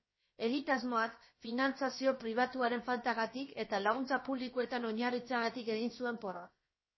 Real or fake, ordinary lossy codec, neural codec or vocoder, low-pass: fake; MP3, 24 kbps; codec, 16 kHz, about 1 kbps, DyCAST, with the encoder's durations; 7.2 kHz